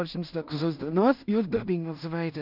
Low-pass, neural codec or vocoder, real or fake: 5.4 kHz; codec, 16 kHz in and 24 kHz out, 0.4 kbps, LongCat-Audio-Codec, two codebook decoder; fake